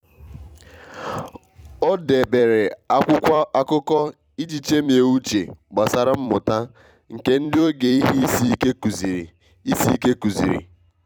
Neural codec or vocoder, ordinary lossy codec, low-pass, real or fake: vocoder, 44.1 kHz, 128 mel bands every 256 samples, BigVGAN v2; none; 19.8 kHz; fake